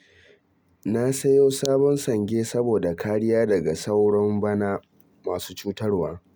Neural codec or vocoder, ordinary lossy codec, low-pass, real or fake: none; none; none; real